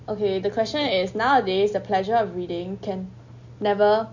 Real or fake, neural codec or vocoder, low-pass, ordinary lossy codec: real; none; 7.2 kHz; none